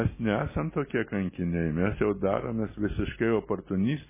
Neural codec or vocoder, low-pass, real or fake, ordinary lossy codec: none; 3.6 kHz; real; MP3, 16 kbps